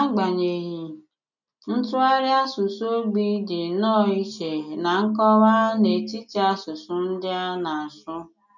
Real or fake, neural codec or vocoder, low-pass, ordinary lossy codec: real; none; 7.2 kHz; none